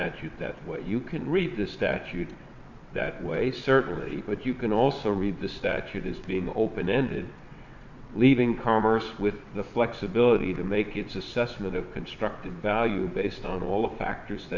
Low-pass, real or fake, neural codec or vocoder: 7.2 kHz; fake; vocoder, 22.05 kHz, 80 mel bands, WaveNeXt